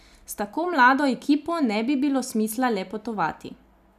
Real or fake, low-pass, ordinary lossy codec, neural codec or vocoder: real; 14.4 kHz; none; none